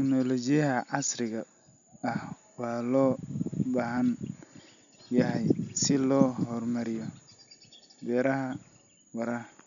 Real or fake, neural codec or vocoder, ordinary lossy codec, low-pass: real; none; none; 7.2 kHz